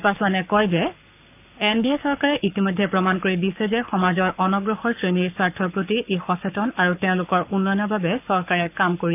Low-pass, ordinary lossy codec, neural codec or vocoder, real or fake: 3.6 kHz; none; codec, 44.1 kHz, 7.8 kbps, Pupu-Codec; fake